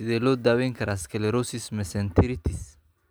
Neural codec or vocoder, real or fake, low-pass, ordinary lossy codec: none; real; none; none